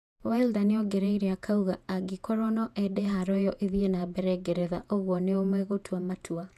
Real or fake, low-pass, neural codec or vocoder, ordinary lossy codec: fake; 14.4 kHz; vocoder, 48 kHz, 128 mel bands, Vocos; none